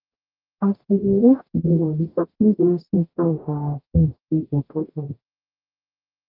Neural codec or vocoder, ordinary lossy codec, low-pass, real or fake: codec, 44.1 kHz, 0.9 kbps, DAC; Opus, 32 kbps; 5.4 kHz; fake